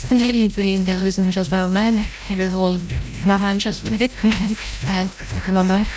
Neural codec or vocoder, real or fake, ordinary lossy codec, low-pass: codec, 16 kHz, 0.5 kbps, FreqCodec, larger model; fake; none; none